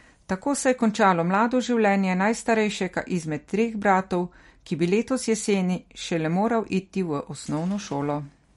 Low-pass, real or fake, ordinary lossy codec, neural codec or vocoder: 19.8 kHz; real; MP3, 48 kbps; none